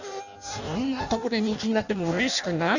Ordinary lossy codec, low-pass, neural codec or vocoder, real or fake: none; 7.2 kHz; codec, 16 kHz in and 24 kHz out, 0.6 kbps, FireRedTTS-2 codec; fake